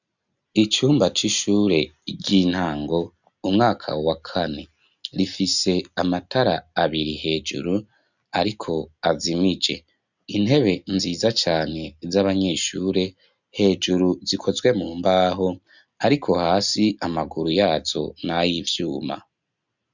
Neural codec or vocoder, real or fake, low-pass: none; real; 7.2 kHz